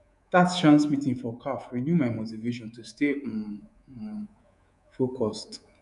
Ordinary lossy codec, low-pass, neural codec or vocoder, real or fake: none; 10.8 kHz; codec, 24 kHz, 3.1 kbps, DualCodec; fake